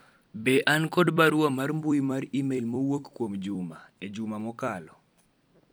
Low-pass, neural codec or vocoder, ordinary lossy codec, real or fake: none; vocoder, 44.1 kHz, 128 mel bands every 256 samples, BigVGAN v2; none; fake